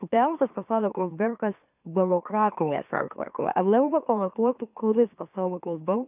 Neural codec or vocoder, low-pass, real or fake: autoencoder, 44.1 kHz, a latent of 192 numbers a frame, MeloTTS; 3.6 kHz; fake